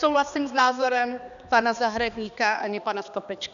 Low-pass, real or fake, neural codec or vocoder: 7.2 kHz; fake; codec, 16 kHz, 2 kbps, X-Codec, HuBERT features, trained on balanced general audio